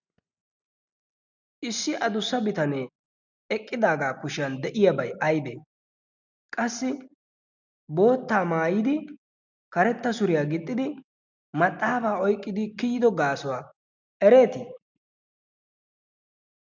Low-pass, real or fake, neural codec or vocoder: 7.2 kHz; real; none